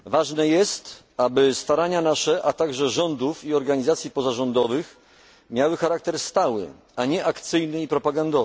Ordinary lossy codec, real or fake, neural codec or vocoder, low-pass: none; real; none; none